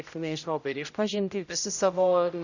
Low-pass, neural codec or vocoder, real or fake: 7.2 kHz; codec, 16 kHz, 0.5 kbps, X-Codec, HuBERT features, trained on general audio; fake